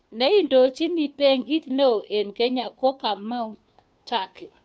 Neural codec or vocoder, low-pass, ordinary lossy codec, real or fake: codec, 16 kHz, 2 kbps, FunCodec, trained on Chinese and English, 25 frames a second; none; none; fake